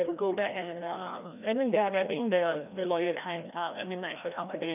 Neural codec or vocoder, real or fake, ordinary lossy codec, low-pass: codec, 16 kHz, 1 kbps, FreqCodec, larger model; fake; none; 3.6 kHz